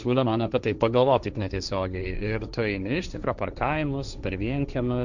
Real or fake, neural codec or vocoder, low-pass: fake; codec, 16 kHz, 1.1 kbps, Voila-Tokenizer; 7.2 kHz